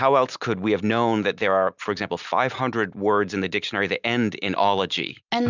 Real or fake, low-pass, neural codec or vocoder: real; 7.2 kHz; none